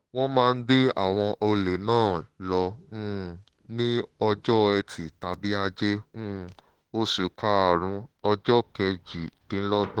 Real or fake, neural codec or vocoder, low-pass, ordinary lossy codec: fake; autoencoder, 48 kHz, 32 numbers a frame, DAC-VAE, trained on Japanese speech; 19.8 kHz; Opus, 16 kbps